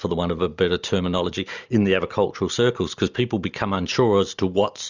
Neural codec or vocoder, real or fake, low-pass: none; real; 7.2 kHz